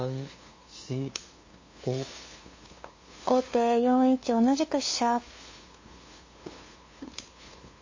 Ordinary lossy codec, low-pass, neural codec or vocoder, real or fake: MP3, 32 kbps; 7.2 kHz; codec, 16 kHz, 2 kbps, FunCodec, trained on LibriTTS, 25 frames a second; fake